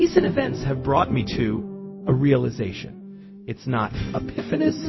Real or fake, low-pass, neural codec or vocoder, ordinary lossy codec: fake; 7.2 kHz; codec, 16 kHz, 0.4 kbps, LongCat-Audio-Codec; MP3, 24 kbps